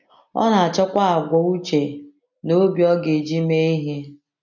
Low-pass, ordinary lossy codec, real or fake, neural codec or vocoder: 7.2 kHz; MP3, 48 kbps; real; none